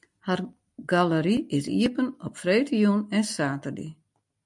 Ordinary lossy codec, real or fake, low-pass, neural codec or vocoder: MP3, 64 kbps; real; 10.8 kHz; none